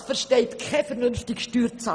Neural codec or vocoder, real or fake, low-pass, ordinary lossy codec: none; real; 9.9 kHz; none